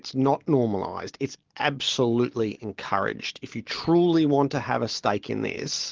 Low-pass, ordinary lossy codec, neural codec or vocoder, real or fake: 7.2 kHz; Opus, 16 kbps; none; real